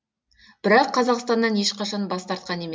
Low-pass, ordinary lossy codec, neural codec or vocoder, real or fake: none; none; none; real